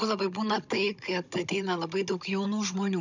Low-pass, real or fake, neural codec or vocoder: 7.2 kHz; fake; codec, 16 kHz, 16 kbps, FunCodec, trained on Chinese and English, 50 frames a second